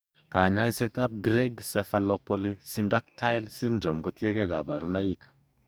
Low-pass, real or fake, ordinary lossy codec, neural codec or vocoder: none; fake; none; codec, 44.1 kHz, 2.6 kbps, DAC